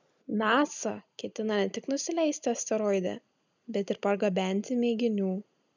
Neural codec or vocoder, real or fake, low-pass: none; real; 7.2 kHz